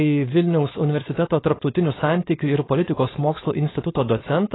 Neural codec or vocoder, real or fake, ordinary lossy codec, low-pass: codec, 16 kHz, 4.8 kbps, FACodec; fake; AAC, 16 kbps; 7.2 kHz